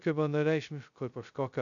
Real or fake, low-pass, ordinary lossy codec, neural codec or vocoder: fake; 7.2 kHz; MP3, 96 kbps; codec, 16 kHz, 0.2 kbps, FocalCodec